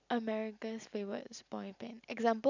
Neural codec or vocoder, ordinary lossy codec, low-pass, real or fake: none; none; 7.2 kHz; real